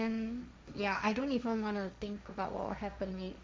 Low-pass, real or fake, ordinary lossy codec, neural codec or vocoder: none; fake; none; codec, 16 kHz, 1.1 kbps, Voila-Tokenizer